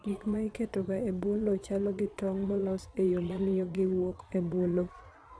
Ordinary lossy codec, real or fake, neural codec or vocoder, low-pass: none; fake; vocoder, 22.05 kHz, 80 mel bands, Vocos; none